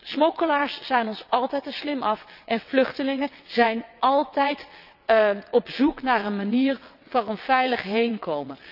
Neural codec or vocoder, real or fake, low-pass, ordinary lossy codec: vocoder, 22.05 kHz, 80 mel bands, WaveNeXt; fake; 5.4 kHz; none